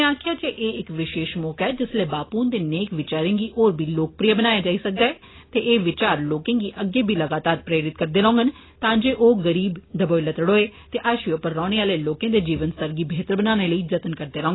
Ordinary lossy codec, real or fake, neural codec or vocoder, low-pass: AAC, 16 kbps; real; none; 7.2 kHz